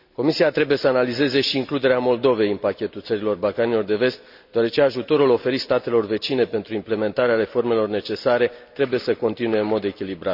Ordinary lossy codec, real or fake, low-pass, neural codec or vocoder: none; real; 5.4 kHz; none